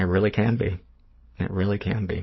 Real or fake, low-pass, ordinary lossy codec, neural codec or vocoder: fake; 7.2 kHz; MP3, 24 kbps; codec, 44.1 kHz, 7.8 kbps, DAC